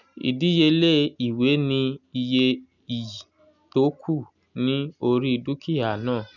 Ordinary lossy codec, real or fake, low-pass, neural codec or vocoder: none; real; 7.2 kHz; none